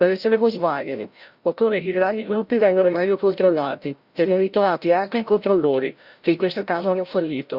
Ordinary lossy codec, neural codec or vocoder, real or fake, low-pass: Opus, 64 kbps; codec, 16 kHz, 0.5 kbps, FreqCodec, larger model; fake; 5.4 kHz